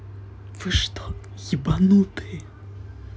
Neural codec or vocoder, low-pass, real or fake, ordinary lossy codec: none; none; real; none